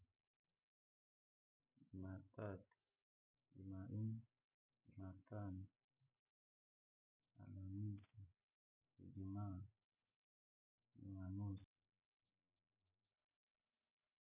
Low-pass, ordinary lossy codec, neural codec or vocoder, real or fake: 3.6 kHz; none; none; real